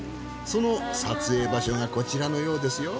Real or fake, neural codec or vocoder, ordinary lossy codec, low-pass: real; none; none; none